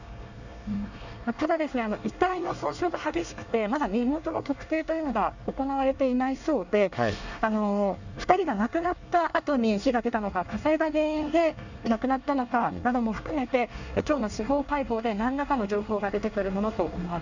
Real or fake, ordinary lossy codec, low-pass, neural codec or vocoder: fake; none; 7.2 kHz; codec, 24 kHz, 1 kbps, SNAC